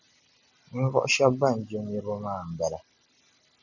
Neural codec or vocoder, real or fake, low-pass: none; real; 7.2 kHz